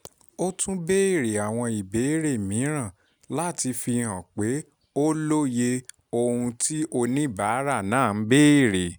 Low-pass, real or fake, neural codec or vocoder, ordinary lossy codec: none; real; none; none